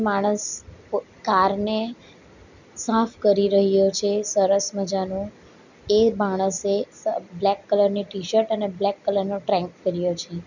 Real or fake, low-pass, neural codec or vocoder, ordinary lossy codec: real; 7.2 kHz; none; none